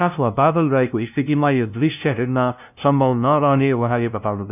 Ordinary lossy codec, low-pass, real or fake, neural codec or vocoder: none; 3.6 kHz; fake; codec, 16 kHz, 0.5 kbps, FunCodec, trained on LibriTTS, 25 frames a second